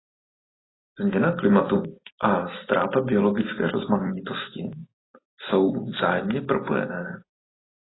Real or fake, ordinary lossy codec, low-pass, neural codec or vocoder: real; AAC, 16 kbps; 7.2 kHz; none